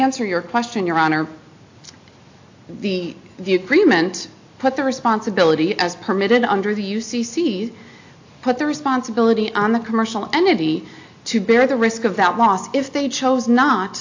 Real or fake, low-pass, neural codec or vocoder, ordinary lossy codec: real; 7.2 kHz; none; AAC, 48 kbps